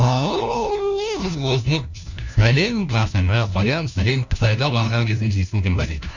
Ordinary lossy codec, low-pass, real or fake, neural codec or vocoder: none; 7.2 kHz; fake; codec, 16 kHz, 1 kbps, FunCodec, trained on LibriTTS, 50 frames a second